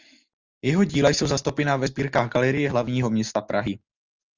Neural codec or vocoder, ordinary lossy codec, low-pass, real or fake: none; Opus, 32 kbps; 7.2 kHz; real